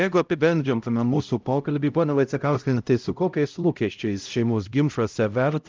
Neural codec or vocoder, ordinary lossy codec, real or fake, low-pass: codec, 16 kHz, 0.5 kbps, X-Codec, HuBERT features, trained on LibriSpeech; Opus, 32 kbps; fake; 7.2 kHz